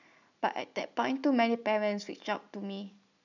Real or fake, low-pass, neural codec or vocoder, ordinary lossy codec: real; 7.2 kHz; none; none